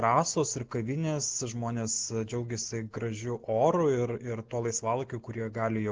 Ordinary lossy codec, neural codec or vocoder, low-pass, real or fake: Opus, 16 kbps; none; 7.2 kHz; real